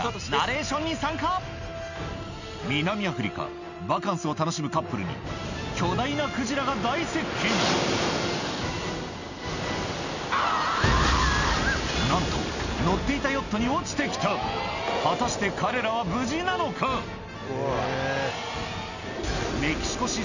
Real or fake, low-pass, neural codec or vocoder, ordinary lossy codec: real; 7.2 kHz; none; none